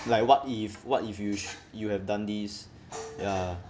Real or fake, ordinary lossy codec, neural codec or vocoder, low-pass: real; none; none; none